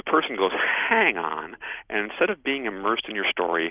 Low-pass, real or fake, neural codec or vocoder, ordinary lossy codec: 3.6 kHz; real; none; Opus, 24 kbps